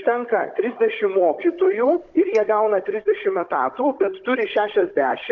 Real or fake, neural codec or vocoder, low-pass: fake; codec, 16 kHz, 16 kbps, FunCodec, trained on Chinese and English, 50 frames a second; 7.2 kHz